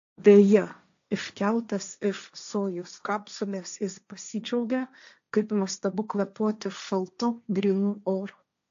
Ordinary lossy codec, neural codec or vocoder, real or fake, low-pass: AAC, 64 kbps; codec, 16 kHz, 1.1 kbps, Voila-Tokenizer; fake; 7.2 kHz